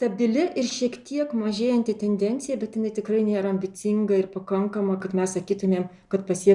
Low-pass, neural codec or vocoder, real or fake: 10.8 kHz; none; real